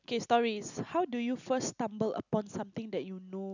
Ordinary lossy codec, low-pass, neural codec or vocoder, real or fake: none; 7.2 kHz; none; real